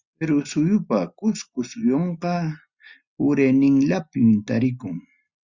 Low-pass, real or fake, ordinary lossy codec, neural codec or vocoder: 7.2 kHz; real; Opus, 64 kbps; none